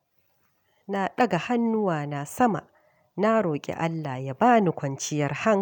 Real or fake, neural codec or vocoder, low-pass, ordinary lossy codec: real; none; 19.8 kHz; none